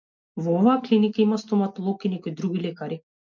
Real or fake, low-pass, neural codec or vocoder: real; 7.2 kHz; none